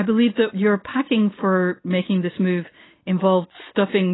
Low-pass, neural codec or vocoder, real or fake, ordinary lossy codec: 7.2 kHz; none; real; AAC, 16 kbps